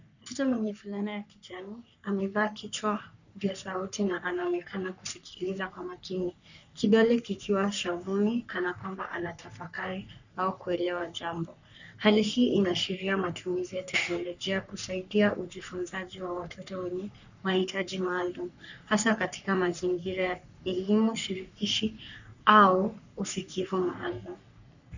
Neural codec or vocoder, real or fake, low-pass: codec, 44.1 kHz, 3.4 kbps, Pupu-Codec; fake; 7.2 kHz